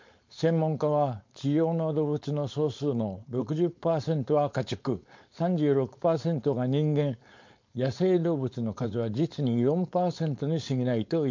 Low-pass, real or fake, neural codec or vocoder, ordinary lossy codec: 7.2 kHz; fake; codec, 16 kHz, 4.8 kbps, FACodec; MP3, 48 kbps